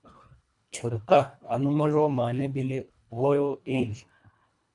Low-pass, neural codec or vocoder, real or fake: 10.8 kHz; codec, 24 kHz, 1.5 kbps, HILCodec; fake